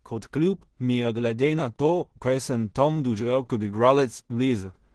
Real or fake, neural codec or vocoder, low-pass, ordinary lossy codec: fake; codec, 16 kHz in and 24 kHz out, 0.4 kbps, LongCat-Audio-Codec, two codebook decoder; 10.8 kHz; Opus, 16 kbps